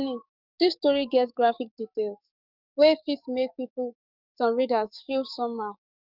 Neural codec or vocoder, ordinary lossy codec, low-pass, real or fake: codec, 44.1 kHz, 7.8 kbps, DAC; none; 5.4 kHz; fake